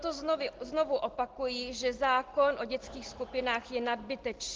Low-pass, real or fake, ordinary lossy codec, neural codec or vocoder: 7.2 kHz; real; Opus, 16 kbps; none